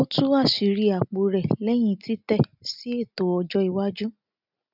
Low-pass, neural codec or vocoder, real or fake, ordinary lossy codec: 5.4 kHz; none; real; none